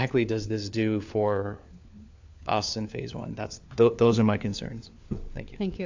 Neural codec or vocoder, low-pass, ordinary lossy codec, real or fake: codec, 16 kHz, 4 kbps, FunCodec, trained on LibriTTS, 50 frames a second; 7.2 kHz; AAC, 48 kbps; fake